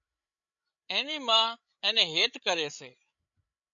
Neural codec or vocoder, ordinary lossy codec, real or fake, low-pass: codec, 16 kHz, 16 kbps, FreqCodec, larger model; MP3, 96 kbps; fake; 7.2 kHz